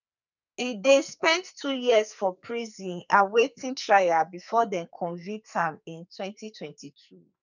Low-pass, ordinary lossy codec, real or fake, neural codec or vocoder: 7.2 kHz; none; fake; codec, 44.1 kHz, 2.6 kbps, SNAC